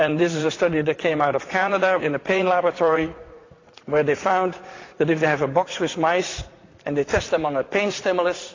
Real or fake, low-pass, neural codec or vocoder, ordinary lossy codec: fake; 7.2 kHz; vocoder, 44.1 kHz, 80 mel bands, Vocos; AAC, 32 kbps